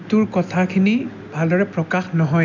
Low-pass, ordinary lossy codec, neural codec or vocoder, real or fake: 7.2 kHz; none; none; real